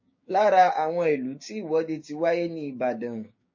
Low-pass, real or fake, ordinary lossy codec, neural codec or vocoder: 7.2 kHz; fake; MP3, 32 kbps; codec, 16 kHz, 6 kbps, DAC